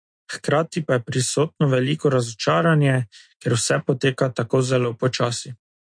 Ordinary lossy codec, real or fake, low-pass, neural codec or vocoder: MP3, 48 kbps; real; 9.9 kHz; none